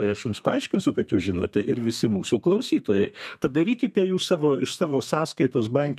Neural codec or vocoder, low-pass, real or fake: codec, 32 kHz, 1.9 kbps, SNAC; 14.4 kHz; fake